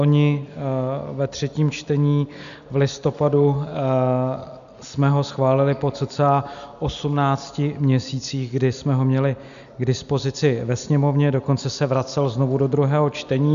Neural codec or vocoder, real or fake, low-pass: none; real; 7.2 kHz